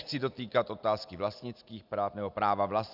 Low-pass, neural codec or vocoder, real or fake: 5.4 kHz; none; real